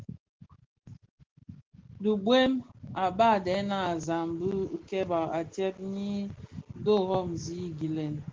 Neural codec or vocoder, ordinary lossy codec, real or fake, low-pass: none; Opus, 16 kbps; real; 7.2 kHz